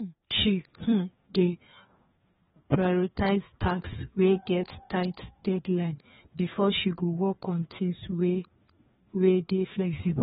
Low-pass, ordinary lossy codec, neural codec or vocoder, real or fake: 7.2 kHz; AAC, 16 kbps; codec, 16 kHz, 2 kbps, FreqCodec, larger model; fake